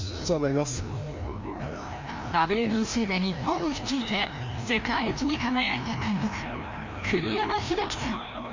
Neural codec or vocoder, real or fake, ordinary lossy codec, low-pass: codec, 16 kHz, 1 kbps, FreqCodec, larger model; fake; MP3, 48 kbps; 7.2 kHz